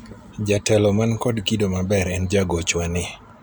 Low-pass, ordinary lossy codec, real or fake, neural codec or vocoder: none; none; real; none